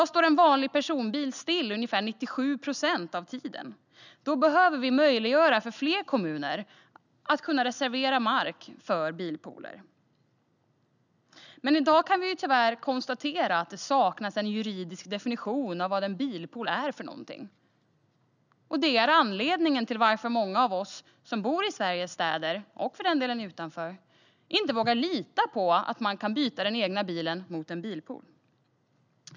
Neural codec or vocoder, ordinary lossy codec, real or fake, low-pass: none; none; real; 7.2 kHz